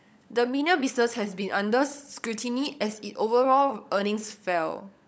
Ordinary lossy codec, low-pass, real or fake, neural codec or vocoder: none; none; fake; codec, 16 kHz, 16 kbps, FunCodec, trained on LibriTTS, 50 frames a second